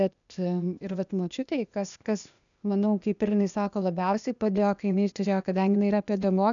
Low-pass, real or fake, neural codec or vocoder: 7.2 kHz; fake; codec, 16 kHz, 0.8 kbps, ZipCodec